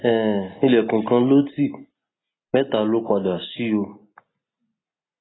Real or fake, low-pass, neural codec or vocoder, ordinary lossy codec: real; 7.2 kHz; none; AAC, 16 kbps